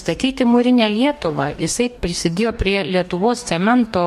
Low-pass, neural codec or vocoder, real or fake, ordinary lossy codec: 14.4 kHz; codec, 44.1 kHz, 2.6 kbps, DAC; fake; MP3, 64 kbps